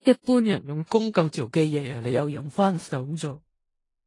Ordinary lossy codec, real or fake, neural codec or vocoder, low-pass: AAC, 32 kbps; fake; codec, 16 kHz in and 24 kHz out, 0.4 kbps, LongCat-Audio-Codec, four codebook decoder; 10.8 kHz